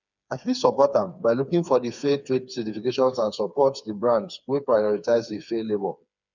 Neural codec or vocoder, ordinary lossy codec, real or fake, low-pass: codec, 16 kHz, 4 kbps, FreqCodec, smaller model; none; fake; 7.2 kHz